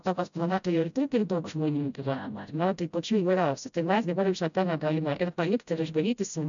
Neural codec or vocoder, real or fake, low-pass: codec, 16 kHz, 0.5 kbps, FreqCodec, smaller model; fake; 7.2 kHz